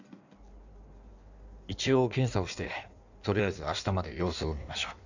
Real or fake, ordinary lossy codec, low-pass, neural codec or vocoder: fake; none; 7.2 kHz; codec, 16 kHz in and 24 kHz out, 1.1 kbps, FireRedTTS-2 codec